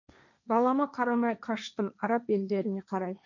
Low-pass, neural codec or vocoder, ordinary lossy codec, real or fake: 7.2 kHz; codec, 16 kHz, 1.1 kbps, Voila-Tokenizer; none; fake